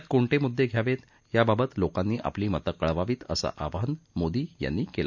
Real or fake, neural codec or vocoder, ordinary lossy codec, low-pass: real; none; none; 7.2 kHz